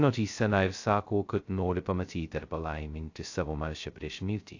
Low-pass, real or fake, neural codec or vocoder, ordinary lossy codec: 7.2 kHz; fake; codec, 16 kHz, 0.2 kbps, FocalCodec; AAC, 48 kbps